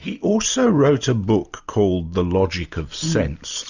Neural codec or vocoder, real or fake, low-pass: none; real; 7.2 kHz